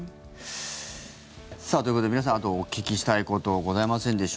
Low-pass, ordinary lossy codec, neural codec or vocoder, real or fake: none; none; none; real